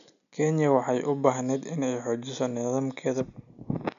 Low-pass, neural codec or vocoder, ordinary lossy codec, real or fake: 7.2 kHz; none; none; real